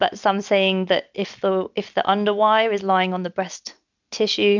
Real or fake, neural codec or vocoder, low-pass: real; none; 7.2 kHz